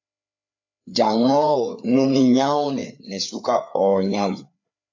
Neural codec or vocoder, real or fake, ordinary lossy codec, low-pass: codec, 16 kHz, 4 kbps, FreqCodec, larger model; fake; AAC, 48 kbps; 7.2 kHz